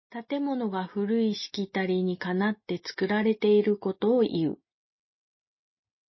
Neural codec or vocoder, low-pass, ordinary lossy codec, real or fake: none; 7.2 kHz; MP3, 24 kbps; real